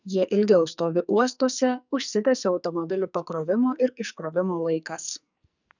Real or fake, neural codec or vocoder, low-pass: fake; codec, 44.1 kHz, 2.6 kbps, SNAC; 7.2 kHz